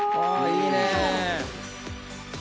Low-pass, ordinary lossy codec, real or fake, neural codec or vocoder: none; none; real; none